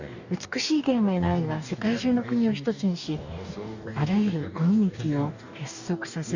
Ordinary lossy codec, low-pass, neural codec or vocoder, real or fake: none; 7.2 kHz; codec, 44.1 kHz, 2.6 kbps, DAC; fake